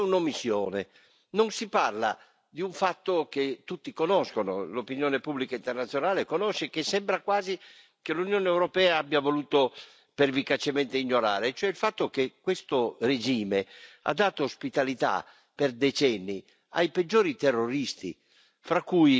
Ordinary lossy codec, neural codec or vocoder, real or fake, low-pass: none; none; real; none